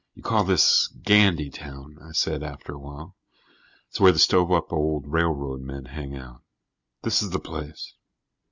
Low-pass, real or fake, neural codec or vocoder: 7.2 kHz; real; none